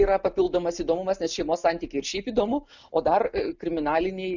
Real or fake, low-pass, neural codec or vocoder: real; 7.2 kHz; none